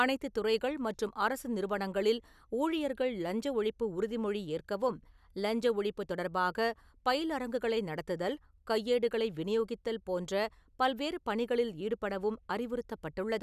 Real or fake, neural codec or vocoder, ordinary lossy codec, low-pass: real; none; none; 14.4 kHz